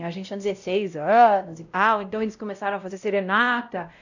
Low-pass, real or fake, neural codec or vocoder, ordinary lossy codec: 7.2 kHz; fake; codec, 16 kHz, 0.5 kbps, X-Codec, WavLM features, trained on Multilingual LibriSpeech; none